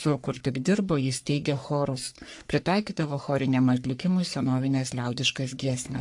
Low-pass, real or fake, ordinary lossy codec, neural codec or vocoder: 10.8 kHz; fake; AAC, 64 kbps; codec, 44.1 kHz, 3.4 kbps, Pupu-Codec